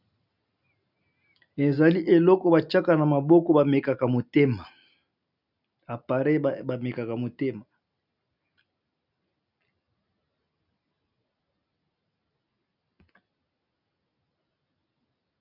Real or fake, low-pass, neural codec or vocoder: real; 5.4 kHz; none